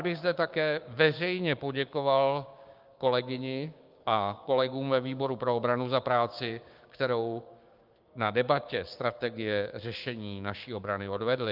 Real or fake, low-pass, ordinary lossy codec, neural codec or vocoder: fake; 5.4 kHz; Opus, 24 kbps; codec, 16 kHz, 6 kbps, DAC